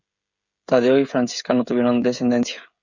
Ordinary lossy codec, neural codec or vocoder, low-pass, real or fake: Opus, 64 kbps; codec, 16 kHz, 16 kbps, FreqCodec, smaller model; 7.2 kHz; fake